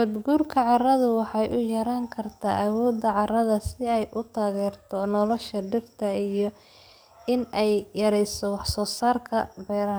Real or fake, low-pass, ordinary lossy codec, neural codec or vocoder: fake; none; none; codec, 44.1 kHz, 7.8 kbps, DAC